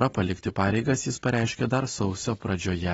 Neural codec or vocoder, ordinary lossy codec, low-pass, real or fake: none; AAC, 24 kbps; 19.8 kHz; real